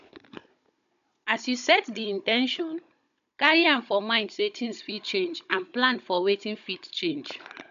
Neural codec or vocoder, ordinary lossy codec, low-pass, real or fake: codec, 16 kHz, 16 kbps, FunCodec, trained on Chinese and English, 50 frames a second; none; 7.2 kHz; fake